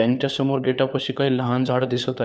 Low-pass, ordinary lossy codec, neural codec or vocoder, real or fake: none; none; codec, 16 kHz, 4 kbps, FunCodec, trained on LibriTTS, 50 frames a second; fake